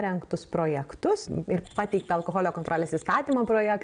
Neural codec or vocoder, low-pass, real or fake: vocoder, 22.05 kHz, 80 mel bands, WaveNeXt; 9.9 kHz; fake